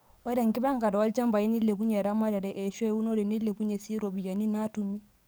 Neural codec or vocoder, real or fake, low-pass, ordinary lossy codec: codec, 44.1 kHz, 7.8 kbps, DAC; fake; none; none